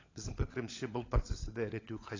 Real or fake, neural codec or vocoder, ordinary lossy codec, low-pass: fake; codec, 16 kHz, 16 kbps, FunCodec, trained on LibriTTS, 50 frames a second; AAC, 32 kbps; 7.2 kHz